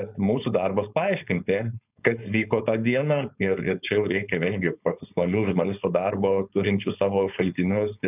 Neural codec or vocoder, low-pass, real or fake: codec, 16 kHz, 4.8 kbps, FACodec; 3.6 kHz; fake